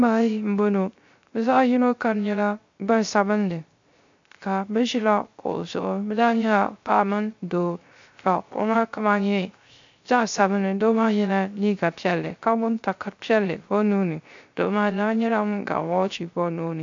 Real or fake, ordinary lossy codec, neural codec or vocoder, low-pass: fake; MP3, 48 kbps; codec, 16 kHz, 0.3 kbps, FocalCodec; 7.2 kHz